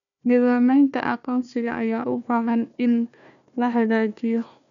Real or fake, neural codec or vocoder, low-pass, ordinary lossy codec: fake; codec, 16 kHz, 1 kbps, FunCodec, trained on Chinese and English, 50 frames a second; 7.2 kHz; none